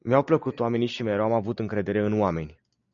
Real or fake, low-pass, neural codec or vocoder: real; 7.2 kHz; none